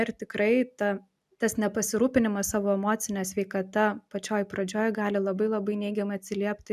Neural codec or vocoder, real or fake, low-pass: none; real; 14.4 kHz